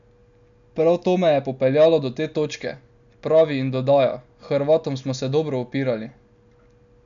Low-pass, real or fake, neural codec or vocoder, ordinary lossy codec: 7.2 kHz; real; none; MP3, 96 kbps